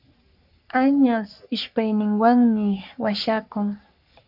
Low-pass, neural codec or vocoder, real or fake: 5.4 kHz; codec, 44.1 kHz, 3.4 kbps, Pupu-Codec; fake